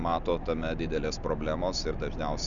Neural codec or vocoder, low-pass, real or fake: none; 7.2 kHz; real